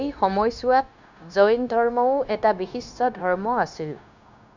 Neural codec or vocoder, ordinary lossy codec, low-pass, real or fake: codec, 16 kHz, 0.9 kbps, LongCat-Audio-Codec; none; 7.2 kHz; fake